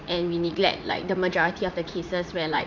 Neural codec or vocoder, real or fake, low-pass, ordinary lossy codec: vocoder, 44.1 kHz, 80 mel bands, Vocos; fake; 7.2 kHz; none